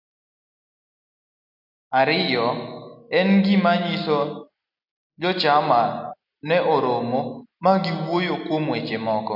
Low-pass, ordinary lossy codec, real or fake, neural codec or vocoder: 5.4 kHz; AAC, 48 kbps; real; none